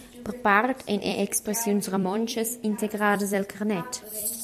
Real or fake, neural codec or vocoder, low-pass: fake; vocoder, 44.1 kHz, 128 mel bands every 256 samples, BigVGAN v2; 14.4 kHz